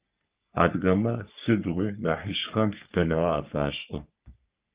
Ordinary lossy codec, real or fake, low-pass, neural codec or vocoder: Opus, 32 kbps; fake; 3.6 kHz; codec, 44.1 kHz, 3.4 kbps, Pupu-Codec